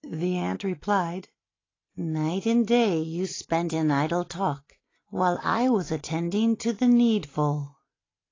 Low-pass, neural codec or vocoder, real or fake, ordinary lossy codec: 7.2 kHz; none; real; AAC, 32 kbps